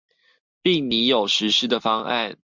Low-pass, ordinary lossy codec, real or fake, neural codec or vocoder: 7.2 kHz; MP3, 64 kbps; real; none